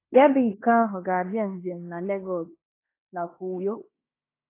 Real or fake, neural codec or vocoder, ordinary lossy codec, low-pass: fake; codec, 16 kHz in and 24 kHz out, 0.9 kbps, LongCat-Audio-Codec, fine tuned four codebook decoder; AAC, 24 kbps; 3.6 kHz